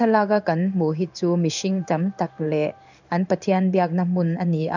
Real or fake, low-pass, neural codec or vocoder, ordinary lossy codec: fake; 7.2 kHz; codec, 16 kHz in and 24 kHz out, 1 kbps, XY-Tokenizer; none